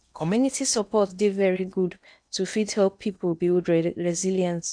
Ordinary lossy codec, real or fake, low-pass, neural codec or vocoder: none; fake; 9.9 kHz; codec, 16 kHz in and 24 kHz out, 0.8 kbps, FocalCodec, streaming, 65536 codes